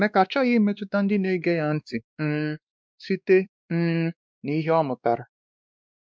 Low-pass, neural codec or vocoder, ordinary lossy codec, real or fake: none; codec, 16 kHz, 2 kbps, X-Codec, WavLM features, trained on Multilingual LibriSpeech; none; fake